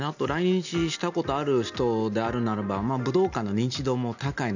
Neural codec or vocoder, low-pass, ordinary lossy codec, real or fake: none; 7.2 kHz; none; real